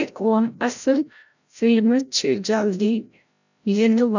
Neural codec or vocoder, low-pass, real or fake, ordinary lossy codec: codec, 16 kHz, 0.5 kbps, FreqCodec, larger model; 7.2 kHz; fake; none